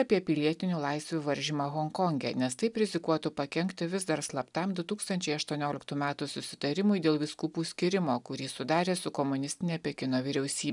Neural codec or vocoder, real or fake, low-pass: none; real; 10.8 kHz